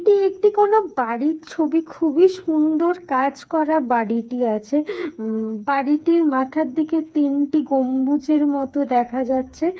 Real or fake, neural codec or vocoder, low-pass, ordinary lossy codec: fake; codec, 16 kHz, 4 kbps, FreqCodec, smaller model; none; none